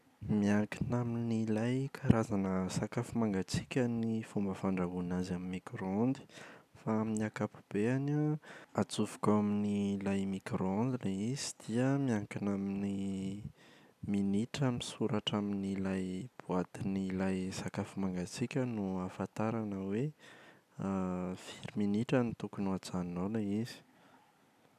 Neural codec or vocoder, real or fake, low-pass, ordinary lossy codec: none; real; 14.4 kHz; none